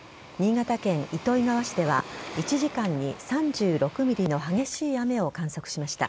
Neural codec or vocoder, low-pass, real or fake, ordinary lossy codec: none; none; real; none